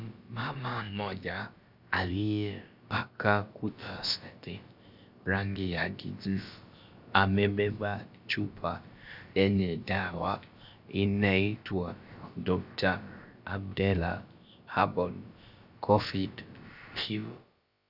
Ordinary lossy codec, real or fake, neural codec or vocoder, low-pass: Opus, 64 kbps; fake; codec, 16 kHz, about 1 kbps, DyCAST, with the encoder's durations; 5.4 kHz